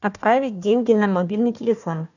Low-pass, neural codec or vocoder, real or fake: 7.2 kHz; codec, 16 kHz, 1 kbps, FunCodec, trained on Chinese and English, 50 frames a second; fake